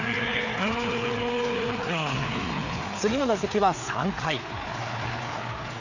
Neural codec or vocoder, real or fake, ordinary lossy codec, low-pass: codec, 16 kHz, 4 kbps, FreqCodec, larger model; fake; none; 7.2 kHz